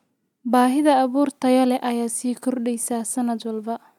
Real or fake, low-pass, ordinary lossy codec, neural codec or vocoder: real; 19.8 kHz; none; none